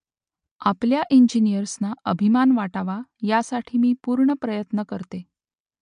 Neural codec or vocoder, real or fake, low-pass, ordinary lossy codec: none; real; 9.9 kHz; MP3, 64 kbps